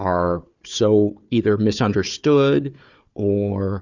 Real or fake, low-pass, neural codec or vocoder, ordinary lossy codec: fake; 7.2 kHz; codec, 16 kHz, 4 kbps, FunCodec, trained on Chinese and English, 50 frames a second; Opus, 64 kbps